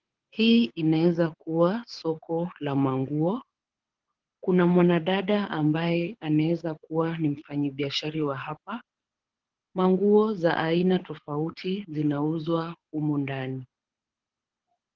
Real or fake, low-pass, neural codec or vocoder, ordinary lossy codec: fake; 7.2 kHz; codec, 24 kHz, 6 kbps, HILCodec; Opus, 16 kbps